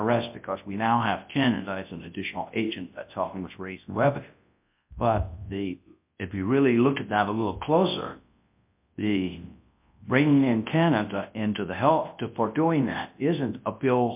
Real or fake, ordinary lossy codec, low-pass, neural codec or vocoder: fake; MP3, 32 kbps; 3.6 kHz; codec, 24 kHz, 0.9 kbps, WavTokenizer, large speech release